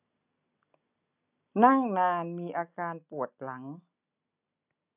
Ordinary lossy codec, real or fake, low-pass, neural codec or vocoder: none; real; 3.6 kHz; none